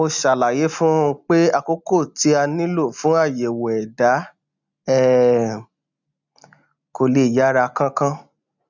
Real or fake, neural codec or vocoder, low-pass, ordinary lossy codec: real; none; 7.2 kHz; none